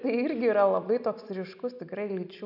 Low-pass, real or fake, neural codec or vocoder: 5.4 kHz; real; none